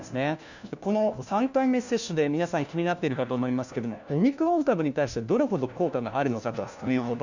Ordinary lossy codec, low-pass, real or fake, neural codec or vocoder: none; 7.2 kHz; fake; codec, 16 kHz, 1 kbps, FunCodec, trained on LibriTTS, 50 frames a second